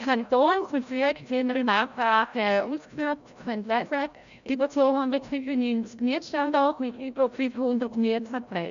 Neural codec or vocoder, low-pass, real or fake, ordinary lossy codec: codec, 16 kHz, 0.5 kbps, FreqCodec, larger model; 7.2 kHz; fake; none